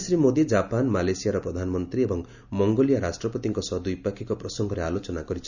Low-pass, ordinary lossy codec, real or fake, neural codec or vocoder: 7.2 kHz; none; real; none